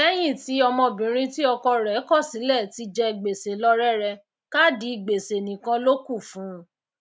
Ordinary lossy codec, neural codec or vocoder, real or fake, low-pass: none; none; real; none